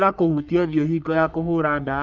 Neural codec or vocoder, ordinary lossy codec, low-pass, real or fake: codec, 44.1 kHz, 3.4 kbps, Pupu-Codec; none; 7.2 kHz; fake